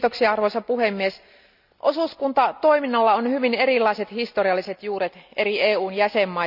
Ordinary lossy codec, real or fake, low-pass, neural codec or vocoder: none; real; 5.4 kHz; none